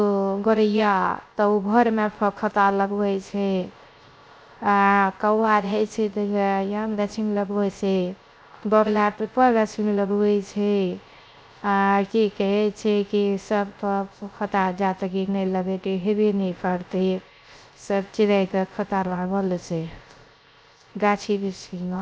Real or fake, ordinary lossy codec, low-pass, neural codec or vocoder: fake; none; none; codec, 16 kHz, 0.3 kbps, FocalCodec